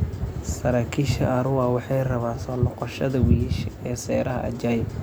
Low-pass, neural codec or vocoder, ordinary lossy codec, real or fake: none; none; none; real